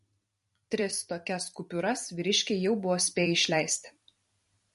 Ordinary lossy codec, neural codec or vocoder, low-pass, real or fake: MP3, 48 kbps; vocoder, 44.1 kHz, 128 mel bands every 256 samples, BigVGAN v2; 14.4 kHz; fake